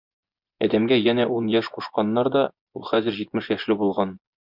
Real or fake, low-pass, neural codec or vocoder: fake; 5.4 kHz; vocoder, 24 kHz, 100 mel bands, Vocos